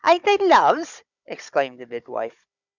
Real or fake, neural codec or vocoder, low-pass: fake; codec, 16 kHz, 16 kbps, FunCodec, trained on Chinese and English, 50 frames a second; 7.2 kHz